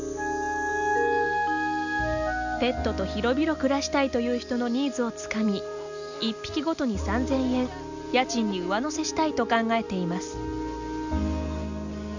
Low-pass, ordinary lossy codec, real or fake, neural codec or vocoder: 7.2 kHz; none; real; none